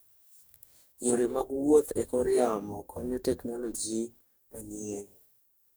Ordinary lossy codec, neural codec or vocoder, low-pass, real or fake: none; codec, 44.1 kHz, 2.6 kbps, DAC; none; fake